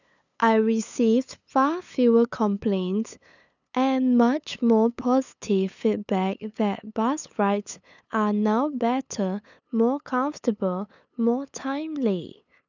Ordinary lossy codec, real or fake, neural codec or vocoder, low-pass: none; fake; codec, 16 kHz, 8 kbps, FunCodec, trained on LibriTTS, 25 frames a second; 7.2 kHz